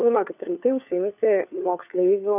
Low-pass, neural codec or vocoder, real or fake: 3.6 kHz; codec, 16 kHz, 4 kbps, FunCodec, trained on LibriTTS, 50 frames a second; fake